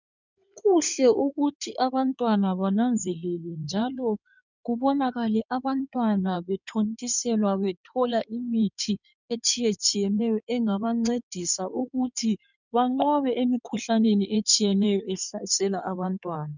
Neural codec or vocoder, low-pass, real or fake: codec, 16 kHz in and 24 kHz out, 2.2 kbps, FireRedTTS-2 codec; 7.2 kHz; fake